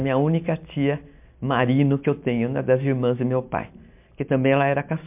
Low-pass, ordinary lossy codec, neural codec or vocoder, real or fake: 3.6 kHz; MP3, 32 kbps; none; real